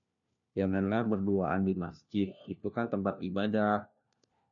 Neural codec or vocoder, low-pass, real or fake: codec, 16 kHz, 1 kbps, FunCodec, trained on LibriTTS, 50 frames a second; 7.2 kHz; fake